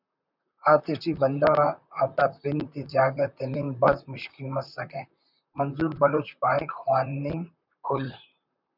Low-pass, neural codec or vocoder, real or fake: 5.4 kHz; vocoder, 44.1 kHz, 128 mel bands, Pupu-Vocoder; fake